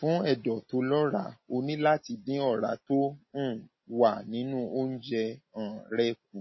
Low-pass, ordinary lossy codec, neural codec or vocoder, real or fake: 7.2 kHz; MP3, 24 kbps; none; real